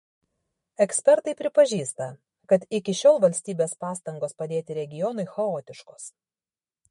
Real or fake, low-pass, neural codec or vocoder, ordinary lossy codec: fake; 19.8 kHz; autoencoder, 48 kHz, 128 numbers a frame, DAC-VAE, trained on Japanese speech; MP3, 48 kbps